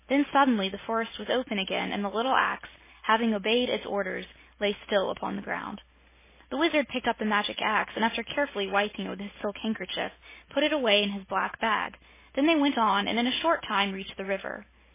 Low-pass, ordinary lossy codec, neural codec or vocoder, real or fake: 3.6 kHz; MP3, 16 kbps; none; real